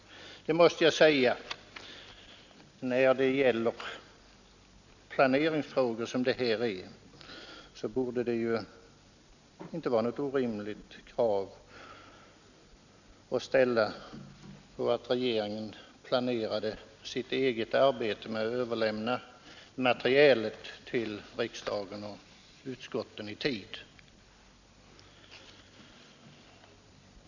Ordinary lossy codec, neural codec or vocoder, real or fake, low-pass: none; none; real; 7.2 kHz